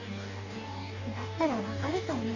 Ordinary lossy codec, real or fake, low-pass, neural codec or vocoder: none; fake; 7.2 kHz; codec, 44.1 kHz, 2.6 kbps, DAC